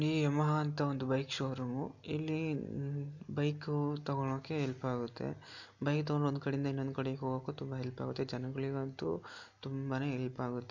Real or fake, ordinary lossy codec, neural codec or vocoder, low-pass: real; none; none; 7.2 kHz